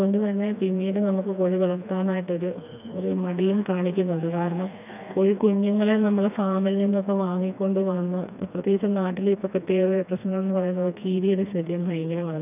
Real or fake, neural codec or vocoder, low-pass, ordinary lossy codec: fake; codec, 16 kHz, 2 kbps, FreqCodec, smaller model; 3.6 kHz; none